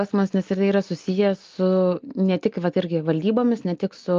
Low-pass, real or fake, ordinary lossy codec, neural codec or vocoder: 7.2 kHz; real; Opus, 24 kbps; none